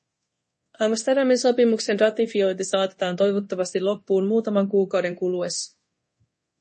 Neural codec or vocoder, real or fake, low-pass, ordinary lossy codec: codec, 24 kHz, 0.9 kbps, DualCodec; fake; 10.8 kHz; MP3, 32 kbps